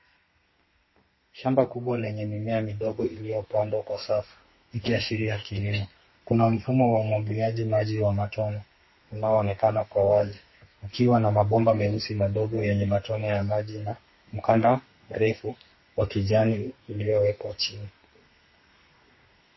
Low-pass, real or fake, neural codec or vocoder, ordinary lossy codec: 7.2 kHz; fake; codec, 32 kHz, 1.9 kbps, SNAC; MP3, 24 kbps